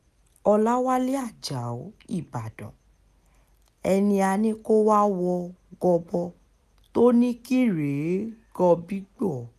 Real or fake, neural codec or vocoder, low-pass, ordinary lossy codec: real; none; 14.4 kHz; none